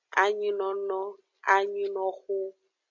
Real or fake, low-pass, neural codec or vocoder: real; 7.2 kHz; none